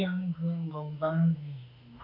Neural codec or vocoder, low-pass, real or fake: autoencoder, 48 kHz, 32 numbers a frame, DAC-VAE, trained on Japanese speech; 5.4 kHz; fake